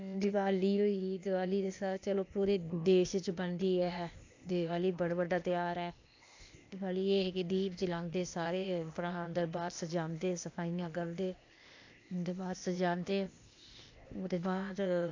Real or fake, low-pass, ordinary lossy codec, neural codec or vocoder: fake; 7.2 kHz; AAC, 48 kbps; codec, 16 kHz, 0.8 kbps, ZipCodec